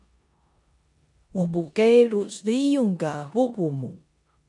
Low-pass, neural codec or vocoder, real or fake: 10.8 kHz; codec, 16 kHz in and 24 kHz out, 0.9 kbps, LongCat-Audio-Codec, four codebook decoder; fake